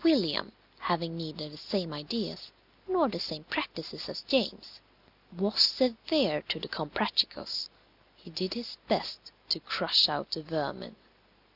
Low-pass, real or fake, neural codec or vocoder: 5.4 kHz; real; none